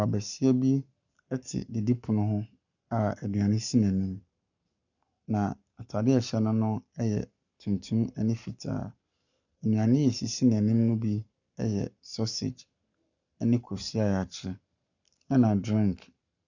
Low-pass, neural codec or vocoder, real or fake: 7.2 kHz; codec, 44.1 kHz, 7.8 kbps, Pupu-Codec; fake